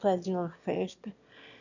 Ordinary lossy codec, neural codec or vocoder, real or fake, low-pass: none; autoencoder, 22.05 kHz, a latent of 192 numbers a frame, VITS, trained on one speaker; fake; 7.2 kHz